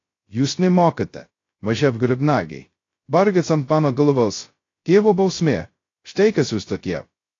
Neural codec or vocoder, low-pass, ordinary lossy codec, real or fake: codec, 16 kHz, 0.2 kbps, FocalCodec; 7.2 kHz; AAC, 32 kbps; fake